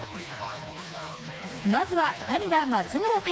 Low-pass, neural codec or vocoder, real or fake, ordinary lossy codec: none; codec, 16 kHz, 2 kbps, FreqCodec, smaller model; fake; none